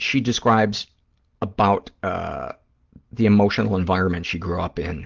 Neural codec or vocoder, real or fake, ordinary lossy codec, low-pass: none; real; Opus, 16 kbps; 7.2 kHz